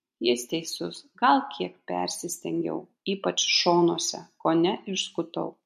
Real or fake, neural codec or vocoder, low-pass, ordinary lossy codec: real; none; 14.4 kHz; MP3, 64 kbps